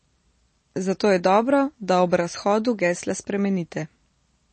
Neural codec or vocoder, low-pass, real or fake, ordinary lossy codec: none; 9.9 kHz; real; MP3, 32 kbps